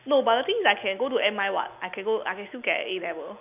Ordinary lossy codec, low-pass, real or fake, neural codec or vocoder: none; 3.6 kHz; real; none